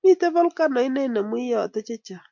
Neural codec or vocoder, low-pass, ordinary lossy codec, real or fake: none; 7.2 kHz; MP3, 64 kbps; real